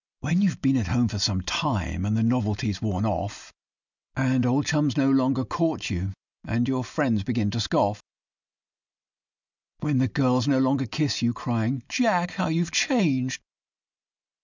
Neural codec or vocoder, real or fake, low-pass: none; real; 7.2 kHz